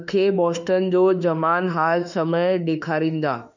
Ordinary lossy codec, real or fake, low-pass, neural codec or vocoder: none; fake; 7.2 kHz; autoencoder, 48 kHz, 32 numbers a frame, DAC-VAE, trained on Japanese speech